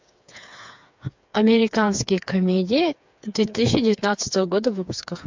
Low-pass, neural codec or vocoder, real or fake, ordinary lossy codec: 7.2 kHz; codec, 16 kHz, 4 kbps, FreqCodec, smaller model; fake; MP3, 64 kbps